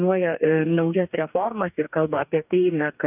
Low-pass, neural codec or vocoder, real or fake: 3.6 kHz; codec, 44.1 kHz, 2.6 kbps, DAC; fake